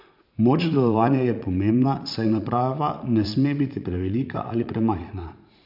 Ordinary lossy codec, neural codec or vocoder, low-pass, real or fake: none; vocoder, 44.1 kHz, 80 mel bands, Vocos; 5.4 kHz; fake